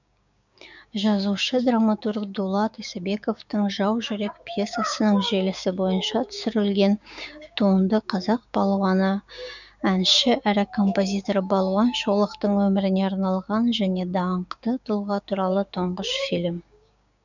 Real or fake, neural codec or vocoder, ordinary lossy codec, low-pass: fake; codec, 16 kHz, 6 kbps, DAC; none; 7.2 kHz